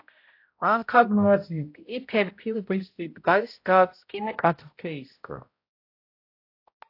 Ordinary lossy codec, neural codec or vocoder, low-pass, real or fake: AAC, 32 kbps; codec, 16 kHz, 0.5 kbps, X-Codec, HuBERT features, trained on balanced general audio; 5.4 kHz; fake